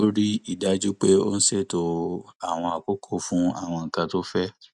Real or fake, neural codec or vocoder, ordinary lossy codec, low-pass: real; none; none; 10.8 kHz